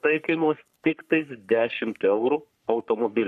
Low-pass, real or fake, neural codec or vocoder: 14.4 kHz; fake; codec, 44.1 kHz, 3.4 kbps, Pupu-Codec